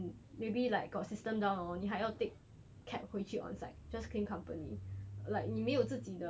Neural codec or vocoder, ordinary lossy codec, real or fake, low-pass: none; none; real; none